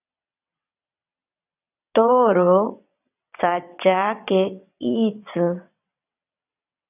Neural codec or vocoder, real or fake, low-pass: vocoder, 22.05 kHz, 80 mel bands, WaveNeXt; fake; 3.6 kHz